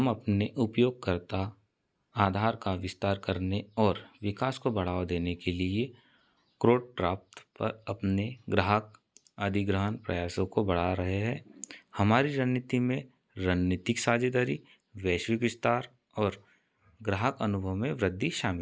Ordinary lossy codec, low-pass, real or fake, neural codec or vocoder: none; none; real; none